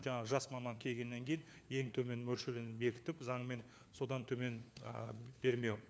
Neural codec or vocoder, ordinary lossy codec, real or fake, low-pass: codec, 16 kHz, 4 kbps, FunCodec, trained on LibriTTS, 50 frames a second; none; fake; none